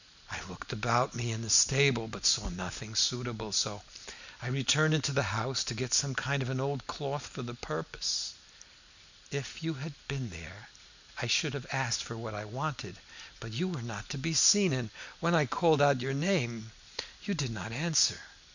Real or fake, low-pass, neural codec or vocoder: real; 7.2 kHz; none